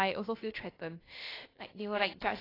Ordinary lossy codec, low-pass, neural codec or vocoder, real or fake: AAC, 24 kbps; 5.4 kHz; codec, 16 kHz, about 1 kbps, DyCAST, with the encoder's durations; fake